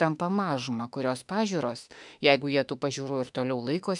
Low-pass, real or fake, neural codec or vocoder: 10.8 kHz; fake; autoencoder, 48 kHz, 32 numbers a frame, DAC-VAE, trained on Japanese speech